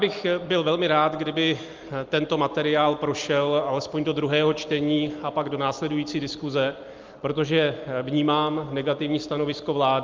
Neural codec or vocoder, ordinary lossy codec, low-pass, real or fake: none; Opus, 32 kbps; 7.2 kHz; real